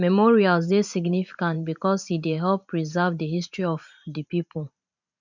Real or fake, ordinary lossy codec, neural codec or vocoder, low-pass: fake; none; vocoder, 44.1 kHz, 128 mel bands every 512 samples, BigVGAN v2; 7.2 kHz